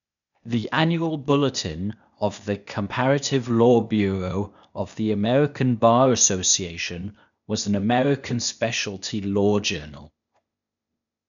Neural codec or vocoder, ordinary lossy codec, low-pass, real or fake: codec, 16 kHz, 0.8 kbps, ZipCodec; none; 7.2 kHz; fake